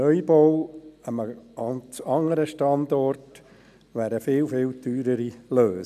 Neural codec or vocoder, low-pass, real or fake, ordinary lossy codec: none; 14.4 kHz; real; none